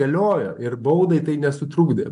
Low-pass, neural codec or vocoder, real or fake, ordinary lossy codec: 10.8 kHz; none; real; MP3, 64 kbps